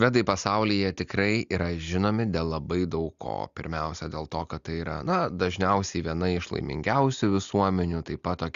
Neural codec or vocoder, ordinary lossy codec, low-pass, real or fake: none; Opus, 64 kbps; 7.2 kHz; real